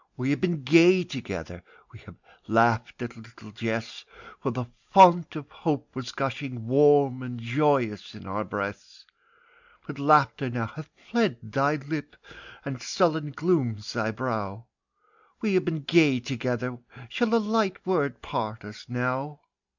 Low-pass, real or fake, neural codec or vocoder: 7.2 kHz; real; none